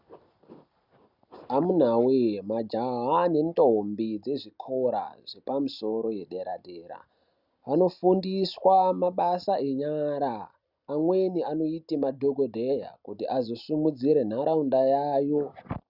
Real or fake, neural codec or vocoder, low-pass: real; none; 5.4 kHz